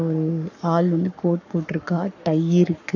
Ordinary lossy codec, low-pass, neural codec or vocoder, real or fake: none; 7.2 kHz; codec, 44.1 kHz, 7.8 kbps, Pupu-Codec; fake